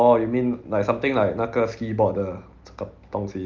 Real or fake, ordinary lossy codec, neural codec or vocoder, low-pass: real; Opus, 24 kbps; none; 7.2 kHz